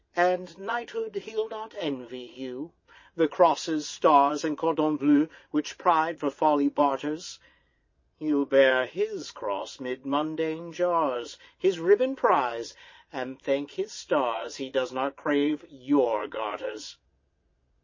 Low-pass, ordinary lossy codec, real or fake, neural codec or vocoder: 7.2 kHz; MP3, 32 kbps; fake; vocoder, 22.05 kHz, 80 mel bands, Vocos